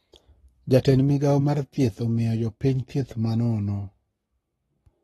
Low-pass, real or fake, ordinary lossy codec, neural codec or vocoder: 19.8 kHz; fake; AAC, 32 kbps; codec, 44.1 kHz, 7.8 kbps, Pupu-Codec